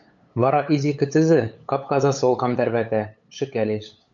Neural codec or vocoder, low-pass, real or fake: codec, 16 kHz, 8 kbps, FunCodec, trained on LibriTTS, 25 frames a second; 7.2 kHz; fake